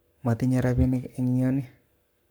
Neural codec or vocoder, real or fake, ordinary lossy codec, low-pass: codec, 44.1 kHz, 7.8 kbps, Pupu-Codec; fake; none; none